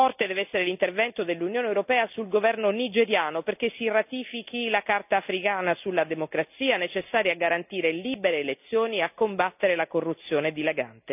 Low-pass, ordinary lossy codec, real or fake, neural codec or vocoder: 3.6 kHz; none; real; none